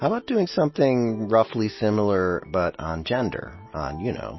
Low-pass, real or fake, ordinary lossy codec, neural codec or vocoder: 7.2 kHz; real; MP3, 24 kbps; none